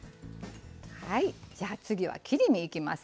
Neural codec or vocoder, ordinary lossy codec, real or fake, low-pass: none; none; real; none